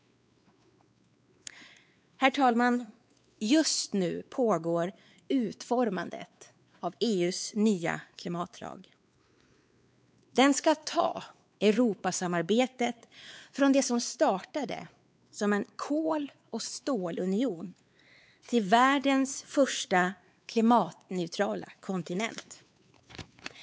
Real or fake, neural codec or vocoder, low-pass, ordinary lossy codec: fake; codec, 16 kHz, 4 kbps, X-Codec, WavLM features, trained on Multilingual LibriSpeech; none; none